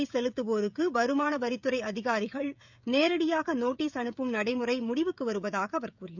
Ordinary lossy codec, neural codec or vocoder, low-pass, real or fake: none; codec, 16 kHz, 16 kbps, FreqCodec, smaller model; 7.2 kHz; fake